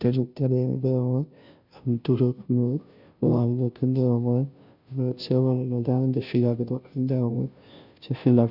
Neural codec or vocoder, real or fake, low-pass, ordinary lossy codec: codec, 16 kHz, 0.5 kbps, FunCodec, trained on Chinese and English, 25 frames a second; fake; 5.4 kHz; none